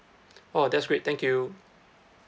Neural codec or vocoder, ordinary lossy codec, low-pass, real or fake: none; none; none; real